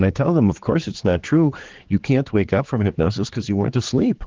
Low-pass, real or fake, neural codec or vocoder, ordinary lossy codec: 7.2 kHz; fake; codec, 16 kHz, 4 kbps, X-Codec, HuBERT features, trained on general audio; Opus, 16 kbps